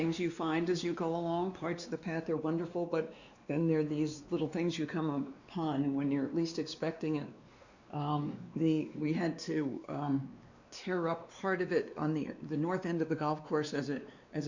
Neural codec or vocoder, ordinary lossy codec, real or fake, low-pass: codec, 16 kHz, 2 kbps, X-Codec, WavLM features, trained on Multilingual LibriSpeech; Opus, 64 kbps; fake; 7.2 kHz